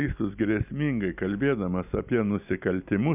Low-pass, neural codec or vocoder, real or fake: 3.6 kHz; none; real